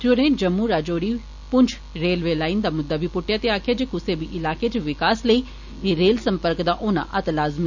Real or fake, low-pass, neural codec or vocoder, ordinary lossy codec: real; 7.2 kHz; none; none